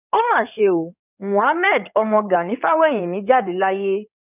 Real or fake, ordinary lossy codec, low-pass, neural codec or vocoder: fake; none; 3.6 kHz; codec, 16 kHz in and 24 kHz out, 2.2 kbps, FireRedTTS-2 codec